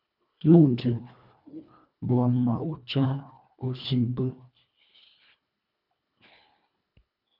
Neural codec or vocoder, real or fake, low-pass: codec, 24 kHz, 1.5 kbps, HILCodec; fake; 5.4 kHz